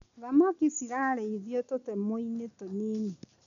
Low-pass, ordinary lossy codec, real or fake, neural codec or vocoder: 7.2 kHz; none; real; none